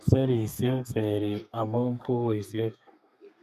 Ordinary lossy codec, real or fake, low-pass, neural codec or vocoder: none; fake; 14.4 kHz; codec, 32 kHz, 1.9 kbps, SNAC